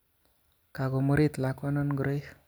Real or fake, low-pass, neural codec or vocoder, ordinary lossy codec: real; none; none; none